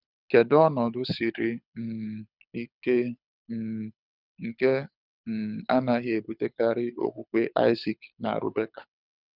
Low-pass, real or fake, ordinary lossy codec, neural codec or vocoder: 5.4 kHz; fake; none; codec, 24 kHz, 6 kbps, HILCodec